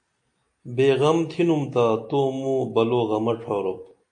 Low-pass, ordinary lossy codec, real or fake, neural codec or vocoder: 9.9 kHz; AAC, 64 kbps; real; none